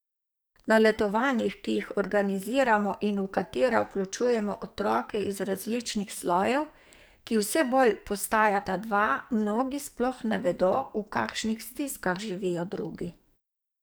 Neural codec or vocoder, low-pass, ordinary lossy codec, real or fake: codec, 44.1 kHz, 2.6 kbps, SNAC; none; none; fake